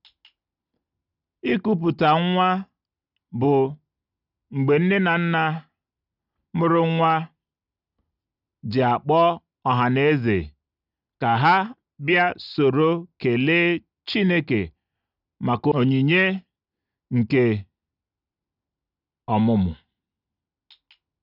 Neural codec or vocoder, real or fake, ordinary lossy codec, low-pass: none; real; none; 5.4 kHz